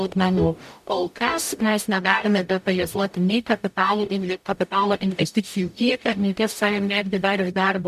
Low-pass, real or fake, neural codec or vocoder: 14.4 kHz; fake; codec, 44.1 kHz, 0.9 kbps, DAC